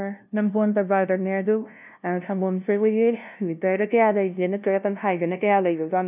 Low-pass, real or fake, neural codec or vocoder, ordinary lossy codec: 3.6 kHz; fake; codec, 16 kHz, 0.5 kbps, FunCodec, trained on LibriTTS, 25 frames a second; none